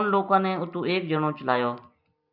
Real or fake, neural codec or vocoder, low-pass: real; none; 5.4 kHz